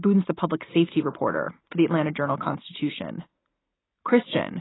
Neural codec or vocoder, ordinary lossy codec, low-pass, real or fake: none; AAC, 16 kbps; 7.2 kHz; real